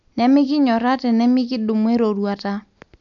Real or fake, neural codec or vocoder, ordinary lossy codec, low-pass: real; none; none; 7.2 kHz